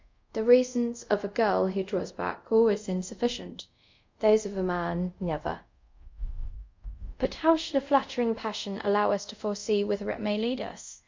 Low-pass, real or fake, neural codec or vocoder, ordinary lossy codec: 7.2 kHz; fake; codec, 24 kHz, 0.5 kbps, DualCodec; MP3, 64 kbps